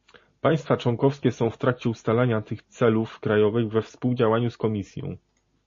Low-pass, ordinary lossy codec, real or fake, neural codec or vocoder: 7.2 kHz; MP3, 32 kbps; real; none